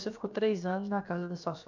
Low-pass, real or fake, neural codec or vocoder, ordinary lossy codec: 7.2 kHz; fake; codec, 16 kHz, about 1 kbps, DyCAST, with the encoder's durations; none